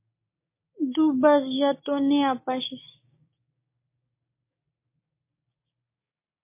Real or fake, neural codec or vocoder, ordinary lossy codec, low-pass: fake; codec, 16 kHz, 6 kbps, DAC; MP3, 24 kbps; 3.6 kHz